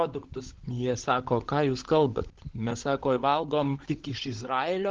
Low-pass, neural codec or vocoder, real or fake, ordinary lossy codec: 7.2 kHz; codec, 16 kHz, 16 kbps, FunCodec, trained on LibriTTS, 50 frames a second; fake; Opus, 16 kbps